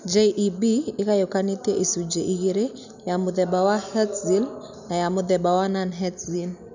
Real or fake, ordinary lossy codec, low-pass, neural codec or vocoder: real; none; 7.2 kHz; none